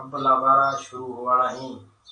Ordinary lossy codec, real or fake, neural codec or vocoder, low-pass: AAC, 64 kbps; real; none; 9.9 kHz